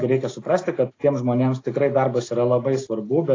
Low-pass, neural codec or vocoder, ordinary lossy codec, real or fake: 7.2 kHz; none; AAC, 32 kbps; real